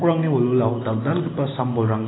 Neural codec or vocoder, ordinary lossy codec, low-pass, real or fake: none; AAC, 16 kbps; 7.2 kHz; real